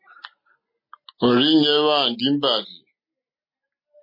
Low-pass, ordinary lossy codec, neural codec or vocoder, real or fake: 5.4 kHz; MP3, 24 kbps; none; real